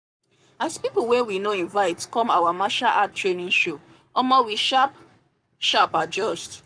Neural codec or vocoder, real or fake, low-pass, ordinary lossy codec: codec, 44.1 kHz, 7.8 kbps, Pupu-Codec; fake; 9.9 kHz; none